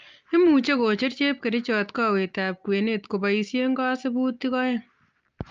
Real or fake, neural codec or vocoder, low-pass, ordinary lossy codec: real; none; 7.2 kHz; Opus, 24 kbps